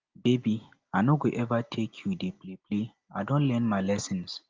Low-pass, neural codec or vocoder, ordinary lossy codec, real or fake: 7.2 kHz; none; Opus, 32 kbps; real